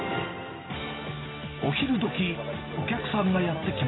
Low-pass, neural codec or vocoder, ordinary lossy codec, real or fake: 7.2 kHz; none; AAC, 16 kbps; real